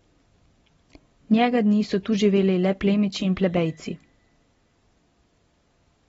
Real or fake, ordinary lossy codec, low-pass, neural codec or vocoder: real; AAC, 24 kbps; 19.8 kHz; none